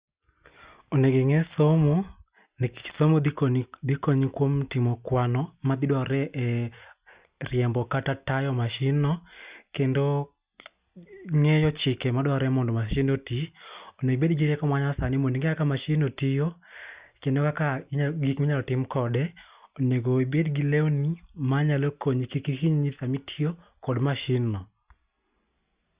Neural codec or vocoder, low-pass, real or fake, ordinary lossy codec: none; 3.6 kHz; real; Opus, 64 kbps